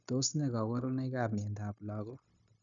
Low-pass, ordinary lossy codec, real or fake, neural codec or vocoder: 7.2 kHz; none; fake; codec, 16 kHz, 0.9 kbps, LongCat-Audio-Codec